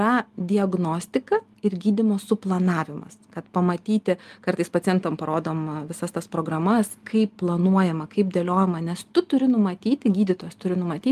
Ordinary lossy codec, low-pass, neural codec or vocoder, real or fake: Opus, 32 kbps; 14.4 kHz; none; real